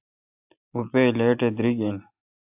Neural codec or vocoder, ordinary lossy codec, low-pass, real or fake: none; AAC, 32 kbps; 3.6 kHz; real